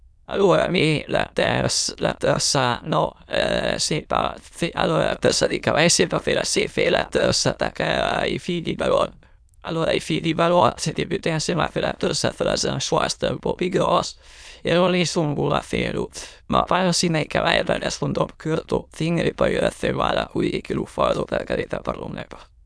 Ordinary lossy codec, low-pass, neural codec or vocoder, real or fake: none; none; autoencoder, 22.05 kHz, a latent of 192 numbers a frame, VITS, trained on many speakers; fake